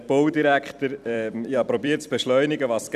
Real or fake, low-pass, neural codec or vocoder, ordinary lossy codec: fake; 14.4 kHz; vocoder, 44.1 kHz, 128 mel bands every 256 samples, BigVGAN v2; none